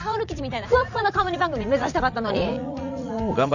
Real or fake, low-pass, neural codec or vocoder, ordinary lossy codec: fake; 7.2 kHz; vocoder, 44.1 kHz, 80 mel bands, Vocos; none